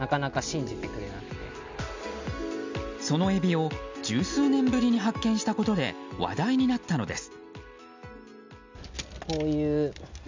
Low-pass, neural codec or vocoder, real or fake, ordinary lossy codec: 7.2 kHz; none; real; MP3, 64 kbps